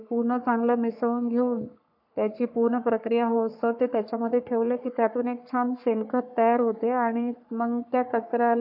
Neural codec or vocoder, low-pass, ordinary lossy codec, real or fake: codec, 44.1 kHz, 3.4 kbps, Pupu-Codec; 5.4 kHz; none; fake